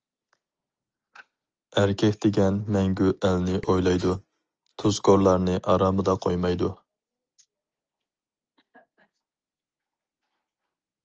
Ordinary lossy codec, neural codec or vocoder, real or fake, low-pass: Opus, 24 kbps; none; real; 7.2 kHz